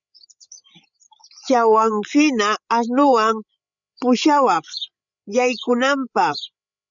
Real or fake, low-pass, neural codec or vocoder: fake; 7.2 kHz; codec, 16 kHz, 8 kbps, FreqCodec, larger model